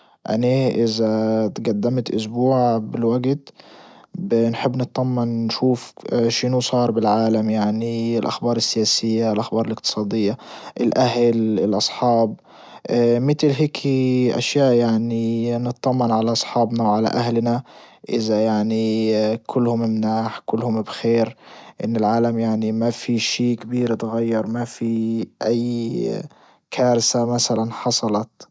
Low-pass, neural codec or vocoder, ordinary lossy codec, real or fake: none; none; none; real